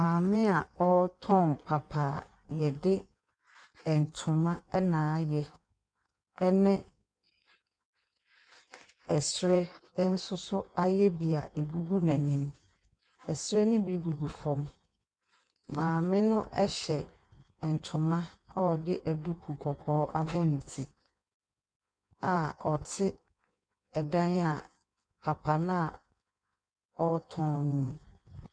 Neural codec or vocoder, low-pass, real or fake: codec, 16 kHz in and 24 kHz out, 1.1 kbps, FireRedTTS-2 codec; 9.9 kHz; fake